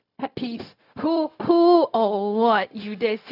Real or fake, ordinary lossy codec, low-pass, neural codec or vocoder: fake; none; 5.4 kHz; codec, 16 kHz, 0.4 kbps, LongCat-Audio-Codec